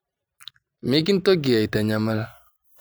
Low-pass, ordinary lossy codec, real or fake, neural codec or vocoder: none; none; real; none